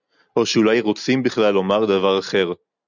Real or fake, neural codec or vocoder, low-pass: real; none; 7.2 kHz